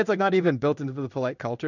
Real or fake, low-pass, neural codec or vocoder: fake; 7.2 kHz; codec, 16 kHz in and 24 kHz out, 1 kbps, XY-Tokenizer